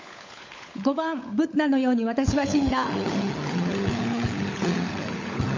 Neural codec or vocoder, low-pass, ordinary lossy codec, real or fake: codec, 16 kHz, 16 kbps, FunCodec, trained on LibriTTS, 50 frames a second; 7.2 kHz; MP3, 48 kbps; fake